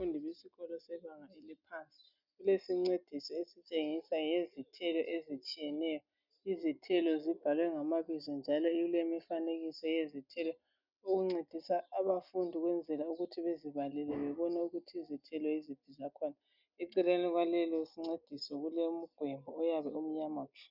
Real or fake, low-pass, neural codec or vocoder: real; 5.4 kHz; none